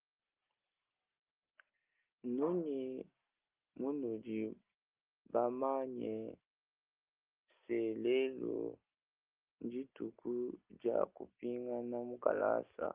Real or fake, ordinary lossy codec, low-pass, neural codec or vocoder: real; Opus, 24 kbps; 3.6 kHz; none